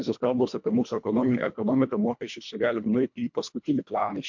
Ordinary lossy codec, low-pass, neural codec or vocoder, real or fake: MP3, 64 kbps; 7.2 kHz; codec, 24 kHz, 1.5 kbps, HILCodec; fake